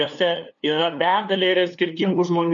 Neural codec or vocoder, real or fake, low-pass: codec, 16 kHz, 2 kbps, FunCodec, trained on LibriTTS, 25 frames a second; fake; 7.2 kHz